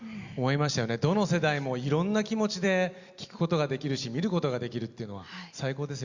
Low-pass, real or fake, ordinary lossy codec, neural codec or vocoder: 7.2 kHz; real; Opus, 64 kbps; none